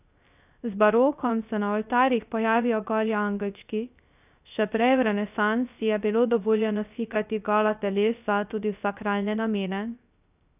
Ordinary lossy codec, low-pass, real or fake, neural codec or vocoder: none; 3.6 kHz; fake; codec, 16 kHz, 0.3 kbps, FocalCodec